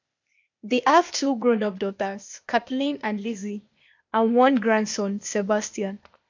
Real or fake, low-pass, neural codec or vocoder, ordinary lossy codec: fake; 7.2 kHz; codec, 16 kHz, 0.8 kbps, ZipCodec; MP3, 64 kbps